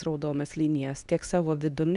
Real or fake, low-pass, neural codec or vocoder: fake; 10.8 kHz; codec, 24 kHz, 0.9 kbps, WavTokenizer, medium speech release version 2